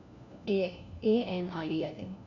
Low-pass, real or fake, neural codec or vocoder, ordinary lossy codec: 7.2 kHz; fake; codec, 16 kHz, 1 kbps, FunCodec, trained on LibriTTS, 50 frames a second; none